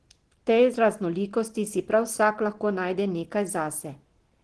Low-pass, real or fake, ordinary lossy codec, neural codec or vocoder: 10.8 kHz; real; Opus, 16 kbps; none